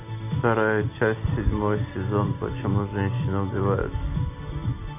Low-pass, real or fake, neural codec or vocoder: 3.6 kHz; real; none